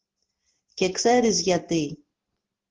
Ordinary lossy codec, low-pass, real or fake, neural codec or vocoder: Opus, 16 kbps; 7.2 kHz; real; none